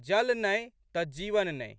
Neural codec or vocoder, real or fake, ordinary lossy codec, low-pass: none; real; none; none